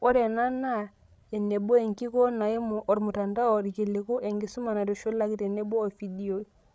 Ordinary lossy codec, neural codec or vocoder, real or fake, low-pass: none; codec, 16 kHz, 16 kbps, FreqCodec, larger model; fake; none